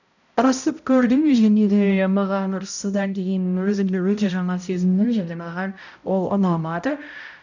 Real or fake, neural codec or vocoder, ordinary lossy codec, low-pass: fake; codec, 16 kHz, 0.5 kbps, X-Codec, HuBERT features, trained on balanced general audio; none; 7.2 kHz